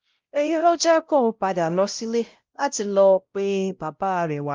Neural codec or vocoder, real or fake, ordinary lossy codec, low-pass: codec, 16 kHz, 0.5 kbps, X-Codec, WavLM features, trained on Multilingual LibriSpeech; fake; Opus, 32 kbps; 7.2 kHz